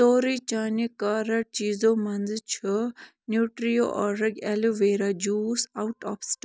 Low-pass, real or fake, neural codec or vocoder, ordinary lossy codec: none; real; none; none